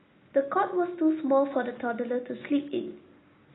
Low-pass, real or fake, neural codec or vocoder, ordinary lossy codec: 7.2 kHz; real; none; AAC, 16 kbps